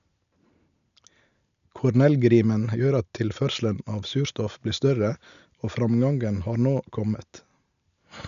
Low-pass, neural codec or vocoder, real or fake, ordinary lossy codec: 7.2 kHz; none; real; none